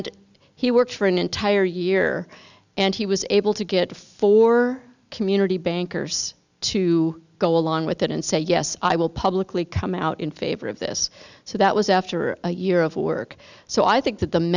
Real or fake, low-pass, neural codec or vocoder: real; 7.2 kHz; none